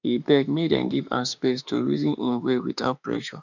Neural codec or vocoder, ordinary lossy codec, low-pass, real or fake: autoencoder, 48 kHz, 32 numbers a frame, DAC-VAE, trained on Japanese speech; none; 7.2 kHz; fake